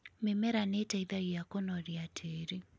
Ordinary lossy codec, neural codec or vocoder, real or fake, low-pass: none; none; real; none